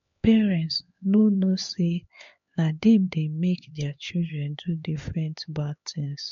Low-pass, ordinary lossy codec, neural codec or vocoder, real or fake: 7.2 kHz; MP3, 48 kbps; codec, 16 kHz, 4 kbps, X-Codec, HuBERT features, trained on LibriSpeech; fake